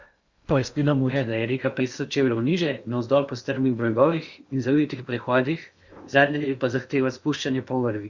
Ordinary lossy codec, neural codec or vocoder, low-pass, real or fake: Opus, 64 kbps; codec, 16 kHz in and 24 kHz out, 0.8 kbps, FocalCodec, streaming, 65536 codes; 7.2 kHz; fake